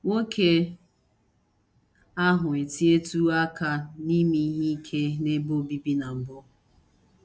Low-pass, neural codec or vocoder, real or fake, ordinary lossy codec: none; none; real; none